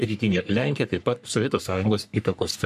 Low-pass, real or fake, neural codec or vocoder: 14.4 kHz; fake; codec, 44.1 kHz, 3.4 kbps, Pupu-Codec